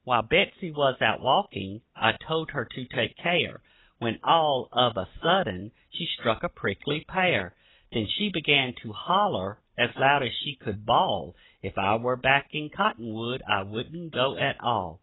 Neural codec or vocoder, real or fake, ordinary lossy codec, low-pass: none; real; AAC, 16 kbps; 7.2 kHz